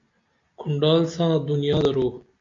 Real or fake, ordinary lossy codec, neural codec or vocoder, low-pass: real; AAC, 48 kbps; none; 7.2 kHz